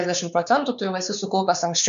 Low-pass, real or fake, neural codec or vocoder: 7.2 kHz; fake; codec, 16 kHz, 4 kbps, X-Codec, WavLM features, trained on Multilingual LibriSpeech